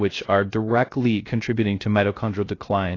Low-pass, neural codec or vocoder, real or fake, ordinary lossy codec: 7.2 kHz; codec, 16 kHz, 0.3 kbps, FocalCodec; fake; AAC, 32 kbps